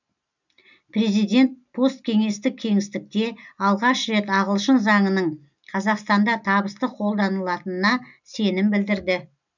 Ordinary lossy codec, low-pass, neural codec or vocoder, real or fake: none; 7.2 kHz; none; real